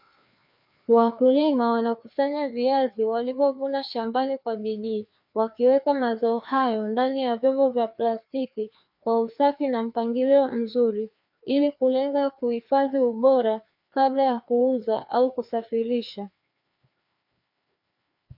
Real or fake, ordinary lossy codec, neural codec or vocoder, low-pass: fake; MP3, 48 kbps; codec, 16 kHz, 2 kbps, FreqCodec, larger model; 5.4 kHz